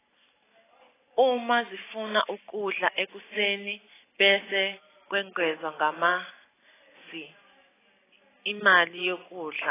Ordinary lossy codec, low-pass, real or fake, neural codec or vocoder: AAC, 16 kbps; 3.6 kHz; real; none